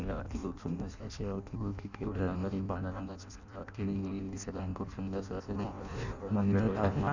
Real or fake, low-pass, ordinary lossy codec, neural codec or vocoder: fake; 7.2 kHz; none; codec, 16 kHz in and 24 kHz out, 0.6 kbps, FireRedTTS-2 codec